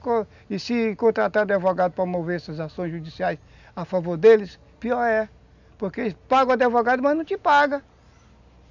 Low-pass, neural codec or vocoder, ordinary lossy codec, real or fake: 7.2 kHz; none; none; real